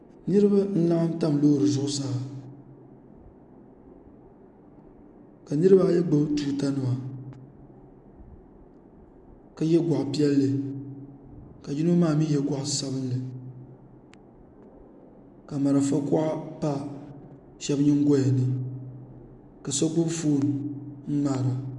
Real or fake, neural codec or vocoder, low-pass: real; none; 10.8 kHz